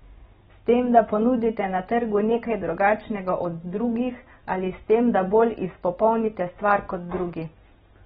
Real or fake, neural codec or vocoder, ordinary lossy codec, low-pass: real; none; AAC, 16 kbps; 7.2 kHz